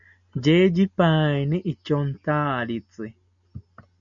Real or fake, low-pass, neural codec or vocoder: real; 7.2 kHz; none